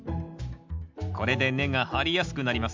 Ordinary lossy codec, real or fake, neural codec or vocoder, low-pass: MP3, 64 kbps; real; none; 7.2 kHz